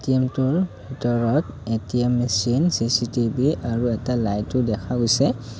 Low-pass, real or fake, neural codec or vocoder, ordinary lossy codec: none; real; none; none